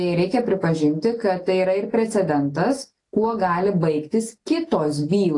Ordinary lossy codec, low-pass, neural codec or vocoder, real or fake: AAC, 48 kbps; 10.8 kHz; none; real